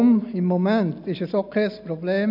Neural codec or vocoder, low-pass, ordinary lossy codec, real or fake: none; 5.4 kHz; none; real